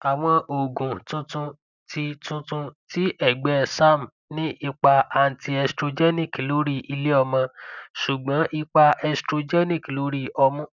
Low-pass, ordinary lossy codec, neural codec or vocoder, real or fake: 7.2 kHz; none; none; real